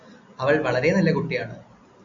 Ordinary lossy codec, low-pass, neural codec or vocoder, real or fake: MP3, 48 kbps; 7.2 kHz; none; real